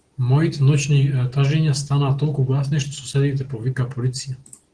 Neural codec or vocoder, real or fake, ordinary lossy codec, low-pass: none; real; Opus, 16 kbps; 9.9 kHz